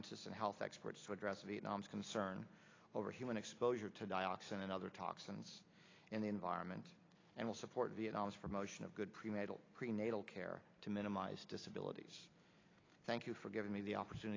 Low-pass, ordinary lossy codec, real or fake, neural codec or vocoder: 7.2 kHz; AAC, 32 kbps; real; none